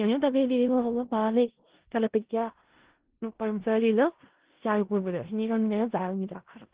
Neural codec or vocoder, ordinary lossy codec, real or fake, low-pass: codec, 16 kHz in and 24 kHz out, 0.4 kbps, LongCat-Audio-Codec, four codebook decoder; Opus, 16 kbps; fake; 3.6 kHz